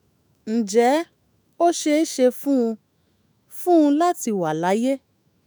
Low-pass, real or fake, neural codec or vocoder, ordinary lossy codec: none; fake; autoencoder, 48 kHz, 128 numbers a frame, DAC-VAE, trained on Japanese speech; none